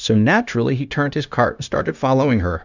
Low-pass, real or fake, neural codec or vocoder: 7.2 kHz; fake; codec, 16 kHz, 0.9 kbps, LongCat-Audio-Codec